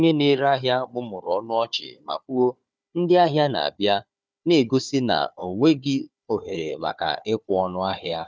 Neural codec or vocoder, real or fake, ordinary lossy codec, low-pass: codec, 16 kHz, 4 kbps, FunCodec, trained on Chinese and English, 50 frames a second; fake; none; none